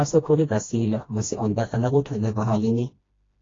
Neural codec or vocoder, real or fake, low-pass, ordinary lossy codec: codec, 16 kHz, 1 kbps, FreqCodec, smaller model; fake; 7.2 kHz; AAC, 32 kbps